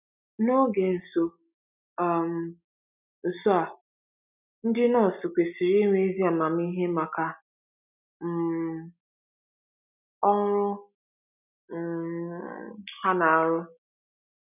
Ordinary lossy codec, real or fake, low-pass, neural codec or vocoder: none; real; 3.6 kHz; none